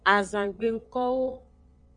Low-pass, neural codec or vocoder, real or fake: 9.9 kHz; vocoder, 22.05 kHz, 80 mel bands, Vocos; fake